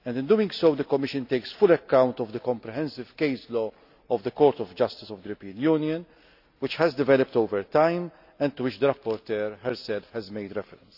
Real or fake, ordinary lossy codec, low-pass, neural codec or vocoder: real; AAC, 48 kbps; 5.4 kHz; none